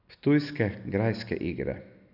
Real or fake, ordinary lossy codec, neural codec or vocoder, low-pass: real; none; none; 5.4 kHz